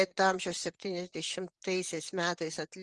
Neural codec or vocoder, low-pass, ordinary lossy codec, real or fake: vocoder, 44.1 kHz, 128 mel bands, Pupu-Vocoder; 10.8 kHz; Opus, 24 kbps; fake